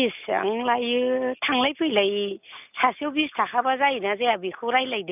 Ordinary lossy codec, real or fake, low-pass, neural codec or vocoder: none; real; 3.6 kHz; none